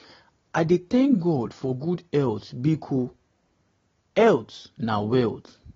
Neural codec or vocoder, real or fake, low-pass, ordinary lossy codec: none; real; 7.2 kHz; AAC, 24 kbps